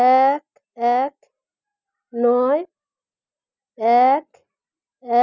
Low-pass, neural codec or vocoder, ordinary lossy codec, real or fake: 7.2 kHz; none; none; real